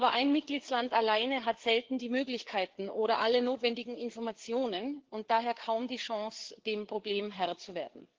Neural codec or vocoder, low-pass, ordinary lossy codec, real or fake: codec, 16 kHz, 4 kbps, FreqCodec, larger model; 7.2 kHz; Opus, 16 kbps; fake